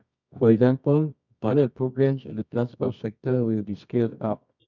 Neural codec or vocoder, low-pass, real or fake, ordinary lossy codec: codec, 24 kHz, 0.9 kbps, WavTokenizer, medium music audio release; 7.2 kHz; fake; none